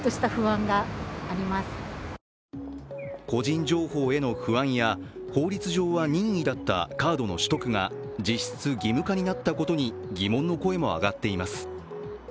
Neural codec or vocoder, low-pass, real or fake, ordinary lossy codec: none; none; real; none